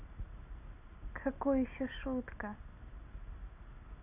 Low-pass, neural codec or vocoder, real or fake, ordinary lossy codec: 3.6 kHz; none; real; none